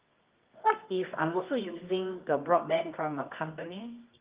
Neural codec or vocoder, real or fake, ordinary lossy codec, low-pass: codec, 24 kHz, 0.9 kbps, WavTokenizer, medium music audio release; fake; Opus, 32 kbps; 3.6 kHz